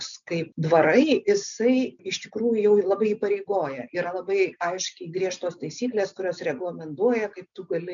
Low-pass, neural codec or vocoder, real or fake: 7.2 kHz; none; real